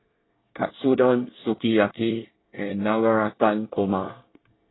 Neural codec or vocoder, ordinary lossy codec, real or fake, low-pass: codec, 24 kHz, 1 kbps, SNAC; AAC, 16 kbps; fake; 7.2 kHz